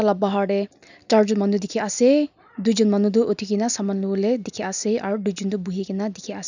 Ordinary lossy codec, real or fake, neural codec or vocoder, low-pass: none; real; none; 7.2 kHz